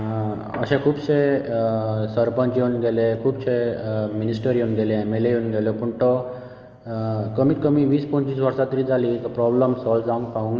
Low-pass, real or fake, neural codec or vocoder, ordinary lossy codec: 7.2 kHz; real; none; Opus, 24 kbps